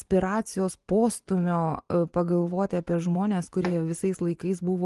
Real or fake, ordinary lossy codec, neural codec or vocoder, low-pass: fake; Opus, 24 kbps; vocoder, 24 kHz, 100 mel bands, Vocos; 10.8 kHz